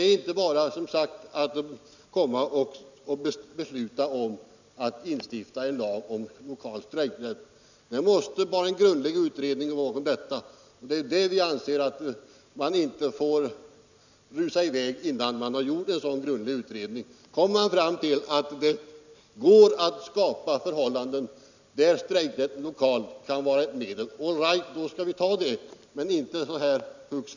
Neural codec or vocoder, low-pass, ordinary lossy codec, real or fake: none; 7.2 kHz; none; real